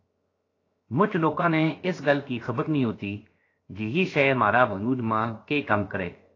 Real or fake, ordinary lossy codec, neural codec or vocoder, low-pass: fake; AAC, 32 kbps; codec, 16 kHz, 0.7 kbps, FocalCodec; 7.2 kHz